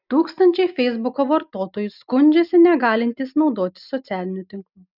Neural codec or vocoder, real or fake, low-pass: none; real; 5.4 kHz